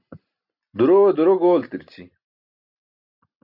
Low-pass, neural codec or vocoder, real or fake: 5.4 kHz; none; real